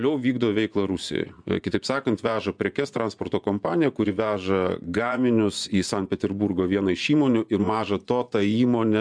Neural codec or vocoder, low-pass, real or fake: vocoder, 24 kHz, 100 mel bands, Vocos; 9.9 kHz; fake